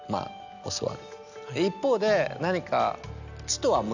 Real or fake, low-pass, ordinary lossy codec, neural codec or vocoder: real; 7.2 kHz; none; none